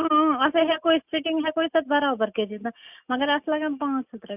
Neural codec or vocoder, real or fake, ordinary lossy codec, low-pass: none; real; none; 3.6 kHz